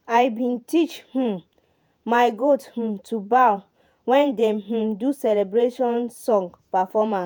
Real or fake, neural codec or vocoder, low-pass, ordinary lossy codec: fake; vocoder, 48 kHz, 128 mel bands, Vocos; none; none